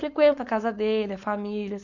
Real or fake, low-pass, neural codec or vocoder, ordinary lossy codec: fake; 7.2 kHz; codec, 16 kHz, 4.8 kbps, FACodec; none